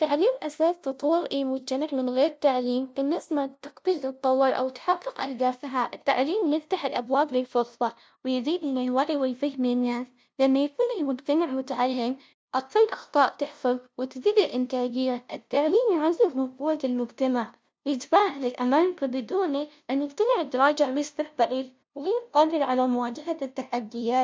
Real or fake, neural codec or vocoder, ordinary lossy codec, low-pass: fake; codec, 16 kHz, 0.5 kbps, FunCodec, trained on LibriTTS, 25 frames a second; none; none